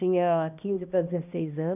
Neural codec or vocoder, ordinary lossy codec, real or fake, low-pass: codec, 16 kHz, 1 kbps, X-Codec, HuBERT features, trained on balanced general audio; none; fake; 3.6 kHz